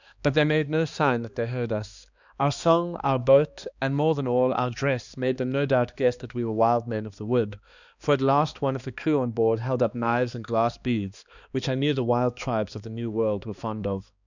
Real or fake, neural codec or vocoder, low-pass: fake; codec, 16 kHz, 2 kbps, X-Codec, HuBERT features, trained on balanced general audio; 7.2 kHz